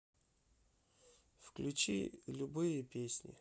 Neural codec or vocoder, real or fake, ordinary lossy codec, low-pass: none; real; none; none